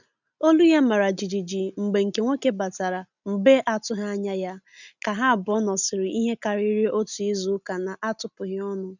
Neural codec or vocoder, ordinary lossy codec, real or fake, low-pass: none; none; real; 7.2 kHz